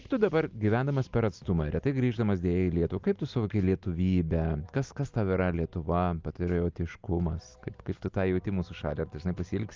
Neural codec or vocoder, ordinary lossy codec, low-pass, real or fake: none; Opus, 24 kbps; 7.2 kHz; real